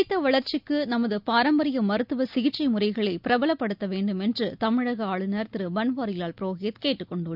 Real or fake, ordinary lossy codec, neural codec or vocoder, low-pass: real; none; none; 5.4 kHz